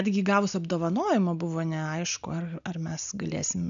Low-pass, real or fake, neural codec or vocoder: 7.2 kHz; real; none